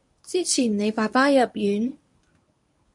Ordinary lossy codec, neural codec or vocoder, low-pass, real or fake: MP3, 64 kbps; codec, 24 kHz, 0.9 kbps, WavTokenizer, medium speech release version 1; 10.8 kHz; fake